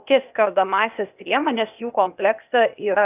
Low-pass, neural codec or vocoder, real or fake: 3.6 kHz; codec, 16 kHz, 0.8 kbps, ZipCodec; fake